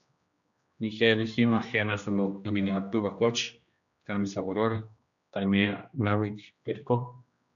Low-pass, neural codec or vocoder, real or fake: 7.2 kHz; codec, 16 kHz, 1 kbps, X-Codec, HuBERT features, trained on general audio; fake